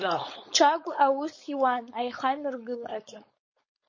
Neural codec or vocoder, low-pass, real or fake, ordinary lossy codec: codec, 16 kHz, 4.8 kbps, FACodec; 7.2 kHz; fake; MP3, 32 kbps